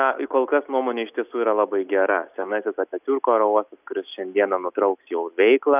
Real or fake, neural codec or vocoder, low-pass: real; none; 3.6 kHz